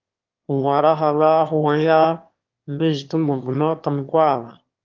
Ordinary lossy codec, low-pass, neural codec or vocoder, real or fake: Opus, 24 kbps; 7.2 kHz; autoencoder, 22.05 kHz, a latent of 192 numbers a frame, VITS, trained on one speaker; fake